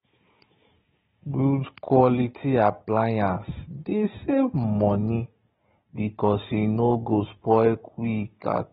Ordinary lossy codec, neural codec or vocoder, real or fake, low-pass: AAC, 16 kbps; codec, 16 kHz, 16 kbps, FunCodec, trained on Chinese and English, 50 frames a second; fake; 7.2 kHz